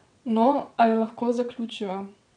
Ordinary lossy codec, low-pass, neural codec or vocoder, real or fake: none; 9.9 kHz; vocoder, 22.05 kHz, 80 mel bands, WaveNeXt; fake